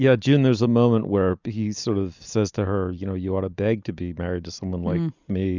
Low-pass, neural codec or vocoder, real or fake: 7.2 kHz; none; real